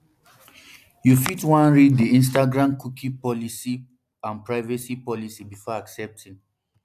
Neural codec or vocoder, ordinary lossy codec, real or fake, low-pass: none; AAC, 96 kbps; real; 14.4 kHz